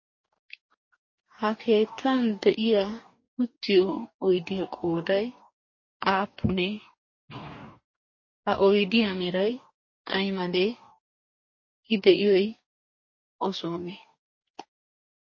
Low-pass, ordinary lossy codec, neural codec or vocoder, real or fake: 7.2 kHz; MP3, 32 kbps; codec, 44.1 kHz, 2.6 kbps, DAC; fake